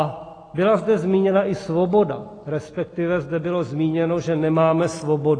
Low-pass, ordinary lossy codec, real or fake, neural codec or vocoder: 9.9 kHz; AAC, 32 kbps; real; none